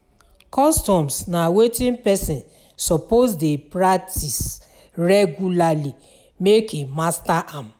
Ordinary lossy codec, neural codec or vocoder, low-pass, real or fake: none; none; none; real